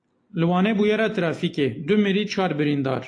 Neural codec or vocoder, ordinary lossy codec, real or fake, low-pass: none; AAC, 48 kbps; real; 9.9 kHz